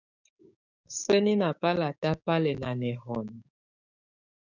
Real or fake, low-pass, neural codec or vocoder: fake; 7.2 kHz; codec, 16 kHz, 6 kbps, DAC